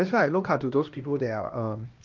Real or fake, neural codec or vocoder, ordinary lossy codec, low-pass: fake; codec, 16 kHz, 1 kbps, X-Codec, HuBERT features, trained on LibriSpeech; Opus, 32 kbps; 7.2 kHz